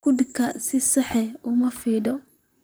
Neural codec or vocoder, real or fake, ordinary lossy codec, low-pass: vocoder, 44.1 kHz, 128 mel bands, Pupu-Vocoder; fake; none; none